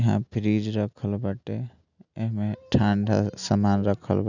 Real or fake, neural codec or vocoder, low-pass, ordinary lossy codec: real; none; 7.2 kHz; none